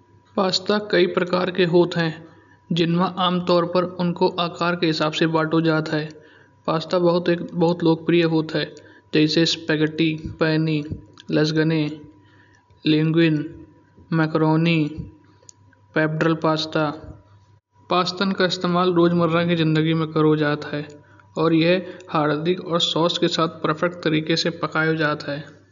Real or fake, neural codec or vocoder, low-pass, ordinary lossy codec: real; none; 7.2 kHz; none